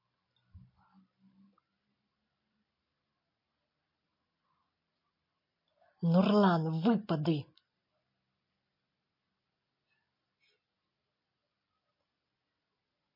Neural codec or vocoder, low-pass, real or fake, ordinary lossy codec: none; 5.4 kHz; real; MP3, 24 kbps